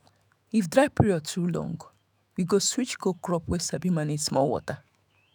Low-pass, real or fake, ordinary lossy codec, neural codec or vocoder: none; fake; none; autoencoder, 48 kHz, 128 numbers a frame, DAC-VAE, trained on Japanese speech